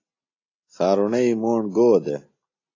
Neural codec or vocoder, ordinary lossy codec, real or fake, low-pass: none; AAC, 32 kbps; real; 7.2 kHz